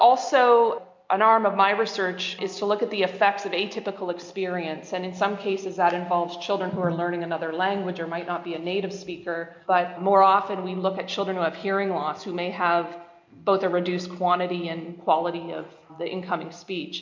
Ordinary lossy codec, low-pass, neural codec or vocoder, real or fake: MP3, 64 kbps; 7.2 kHz; none; real